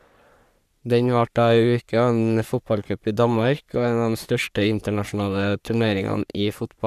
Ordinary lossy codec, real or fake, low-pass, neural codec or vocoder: none; fake; 14.4 kHz; codec, 44.1 kHz, 3.4 kbps, Pupu-Codec